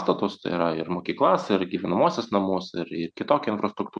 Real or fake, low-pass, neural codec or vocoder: real; 9.9 kHz; none